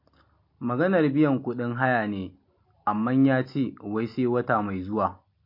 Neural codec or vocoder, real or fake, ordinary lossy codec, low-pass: none; real; MP3, 32 kbps; 5.4 kHz